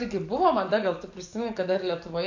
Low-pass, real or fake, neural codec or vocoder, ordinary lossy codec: 7.2 kHz; fake; codec, 44.1 kHz, 7.8 kbps, DAC; AAC, 48 kbps